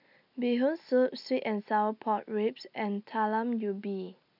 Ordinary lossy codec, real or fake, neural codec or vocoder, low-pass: none; real; none; 5.4 kHz